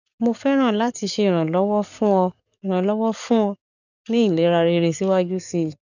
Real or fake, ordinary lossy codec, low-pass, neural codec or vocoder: fake; none; 7.2 kHz; codec, 44.1 kHz, 7.8 kbps, DAC